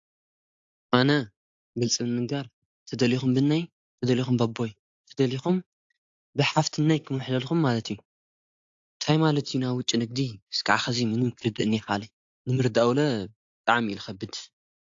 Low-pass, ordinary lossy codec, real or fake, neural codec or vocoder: 7.2 kHz; AAC, 48 kbps; real; none